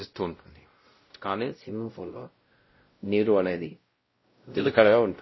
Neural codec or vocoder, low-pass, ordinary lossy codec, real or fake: codec, 16 kHz, 0.5 kbps, X-Codec, WavLM features, trained on Multilingual LibriSpeech; 7.2 kHz; MP3, 24 kbps; fake